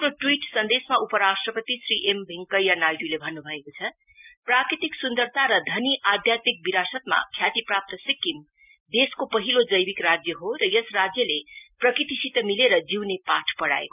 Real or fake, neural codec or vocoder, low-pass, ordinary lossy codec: real; none; 3.6 kHz; none